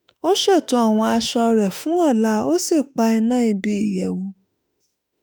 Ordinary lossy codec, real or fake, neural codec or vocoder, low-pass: none; fake; autoencoder, 48 kHz, 32 numbers a frame, DAC-VAE, trained on Japanese speech; none